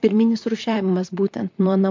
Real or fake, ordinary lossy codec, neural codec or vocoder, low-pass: fake; MP3, 48 kbps; vocoder, 44.1 kHz, 128 mel bands, Pupu-Vocoder; 7.2 kHz